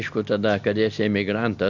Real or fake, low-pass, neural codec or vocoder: real; 7.2 kHz; none